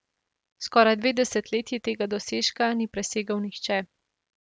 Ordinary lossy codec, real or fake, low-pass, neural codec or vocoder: none; real; none; none